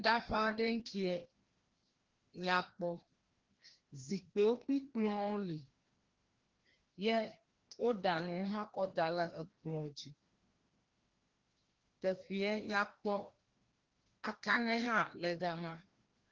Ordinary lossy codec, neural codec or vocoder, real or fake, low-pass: Opus, 16 kbps; codec, 16 kHz, 1 kbps, FreqCodec, larger model; fake; 7.2 kHz